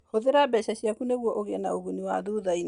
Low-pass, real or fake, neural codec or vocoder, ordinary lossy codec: 9.9 kHz; real; none; Opus, 64 kbps